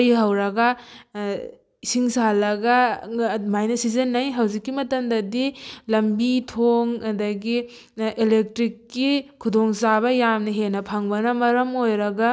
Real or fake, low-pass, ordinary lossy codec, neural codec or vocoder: real; none; none; none